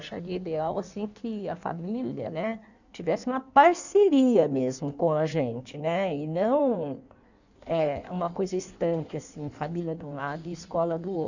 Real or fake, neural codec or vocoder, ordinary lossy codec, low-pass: fake; codec, 16 kHz in and 24 kHz out, 1.1 kbps, FireRedTTS-2 codec; none; 7.2 kHz